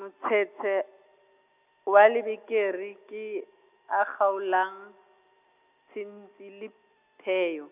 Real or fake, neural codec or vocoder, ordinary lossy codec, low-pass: real; none; none; 3.6 kHz